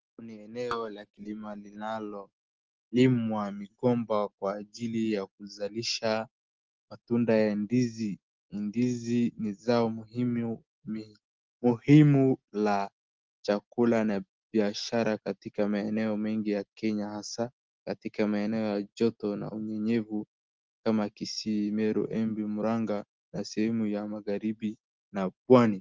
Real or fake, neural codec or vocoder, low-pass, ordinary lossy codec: real; none; 7.2 kHz; Opus, 16 kbps